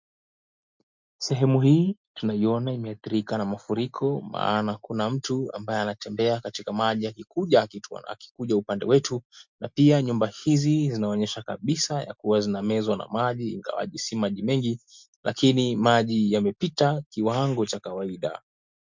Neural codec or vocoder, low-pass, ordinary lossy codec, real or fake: none; 7.2 kHz; MP3, 64 kbps; real